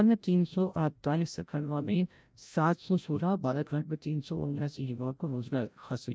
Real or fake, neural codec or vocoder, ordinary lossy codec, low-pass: fake; codec, 16 kHz, 0.5 kbps, FreqCodec, larger model; none; none